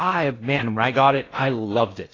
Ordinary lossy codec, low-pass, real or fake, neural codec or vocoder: AAC, 32 kbps; 7.2 kHz; fake; codec, 16 kHz in and 24 kHz out, 0.6 kbps, FocalCodec, streaming, 4096 codes